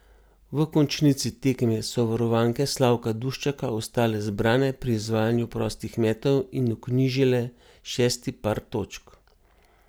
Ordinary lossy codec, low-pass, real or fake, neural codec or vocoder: none; none; real; none